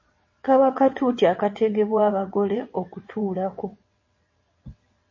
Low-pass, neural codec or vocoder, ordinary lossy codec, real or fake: 7.2 kHz; vocoder, 22.05 kHz, 80 mel bands, WaveNeXt; MP3, 32 kbps; fake